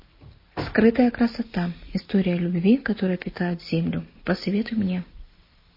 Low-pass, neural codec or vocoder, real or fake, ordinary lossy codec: 5.4 kHz; none; real; MP3, 24 kbps